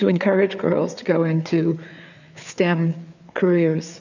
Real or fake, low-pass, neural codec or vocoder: fake; 7.2 kHz; codec, 16 kHz, 4 kbps, FreqCodec, larger model